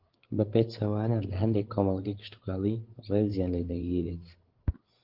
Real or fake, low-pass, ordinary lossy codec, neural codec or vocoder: fake; 5.4 kHz; Opus, 16 kbps; codec, 16 kHz, 16 kbps, FreqCodec, larger model